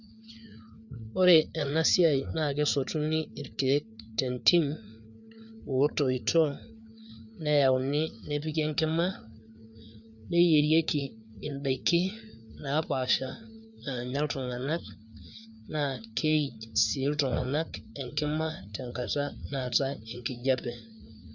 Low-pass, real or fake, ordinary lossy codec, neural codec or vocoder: 7.2 kHz; fake; none; codec, 16 kHz, 4 kbps, FreqCodec, larger model